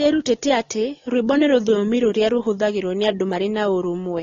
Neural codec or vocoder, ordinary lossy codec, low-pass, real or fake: none; AAC, 32 kbps; 7.2 kHz; real